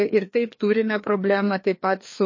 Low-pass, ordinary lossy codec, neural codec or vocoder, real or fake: 7.2 kHz; MP3, 32 kbps; codec, 16 kHz, 2 kbps, FreqCodec, larger model; fake